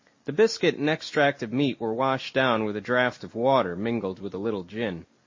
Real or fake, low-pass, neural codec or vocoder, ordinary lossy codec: real; 7.2 kHz; none; MP3, 32 kbps